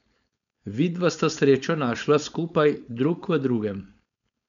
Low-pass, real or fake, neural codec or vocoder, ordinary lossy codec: 7.2 kHz; fake; codec, 16 kHz, 4.8 kbps, FACodec; none